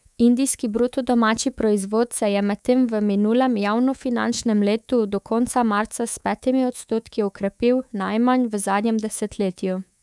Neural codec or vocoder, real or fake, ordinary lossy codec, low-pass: codec, 24 kHz, 3.1 kbps, DualCodec; fake; none; none